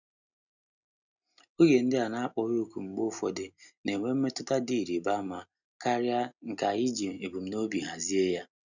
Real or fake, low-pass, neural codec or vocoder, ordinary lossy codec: real; 7.2 kHz; none; none